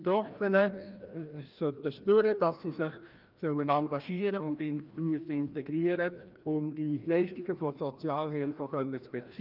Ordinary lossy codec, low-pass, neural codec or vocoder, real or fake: Opus, 32 kbps; 5.4 kHz; codec, 16 kHz, 1 kbps, FreqCodec, larger model; fake